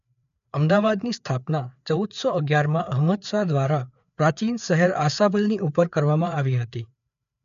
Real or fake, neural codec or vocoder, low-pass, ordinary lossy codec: fake; codec, 16 kHz, 4 kbps, FreqCodec, larger model; 7.2 kHz; none